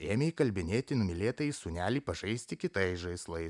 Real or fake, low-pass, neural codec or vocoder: real; 10.8 kHz; none